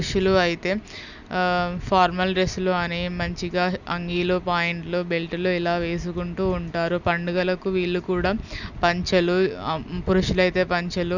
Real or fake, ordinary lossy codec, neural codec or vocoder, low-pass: real; none; none; 7.2 kHz